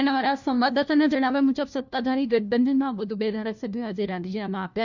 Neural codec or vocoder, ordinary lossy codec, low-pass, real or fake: codec, 16 kHz, 1 kbps, FunCodec, trained on LibriTTS, 50 frames a second; none; 7.2 kHz; fake